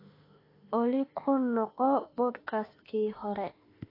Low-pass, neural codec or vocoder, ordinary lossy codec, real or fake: 5.4 kHz; autoencoder, 48 kHz, 32 numbers a frame, DAC-VAE, trained on Japanese speech; AAC, 24 kbps; fake